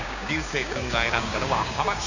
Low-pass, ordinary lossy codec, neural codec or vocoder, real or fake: 7.2 kHz; none; codec, 16 kHz, 6 kbps, DAC; fake